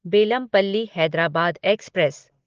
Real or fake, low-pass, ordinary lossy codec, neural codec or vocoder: real; 7.2 kHz; Opus, 16 kbps; none